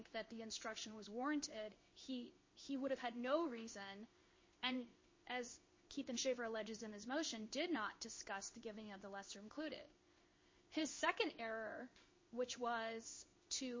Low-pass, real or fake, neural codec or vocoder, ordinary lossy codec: 7.2 kHz; fake; codec, 16 kHz in and 24 kHz out, 1 kbps, XY-Tokenizer; MP3, 32 kbps